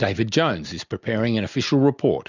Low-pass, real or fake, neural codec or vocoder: 7.2 kHz; real; none